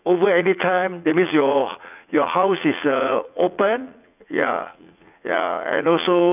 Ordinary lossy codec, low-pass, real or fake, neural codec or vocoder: none; 3.6 kHz; fake; vocoder, 44.1 kHz, 80 mel bands, Vocos